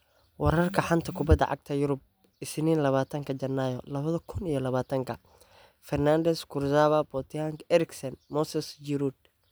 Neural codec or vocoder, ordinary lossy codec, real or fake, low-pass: none; none; real; none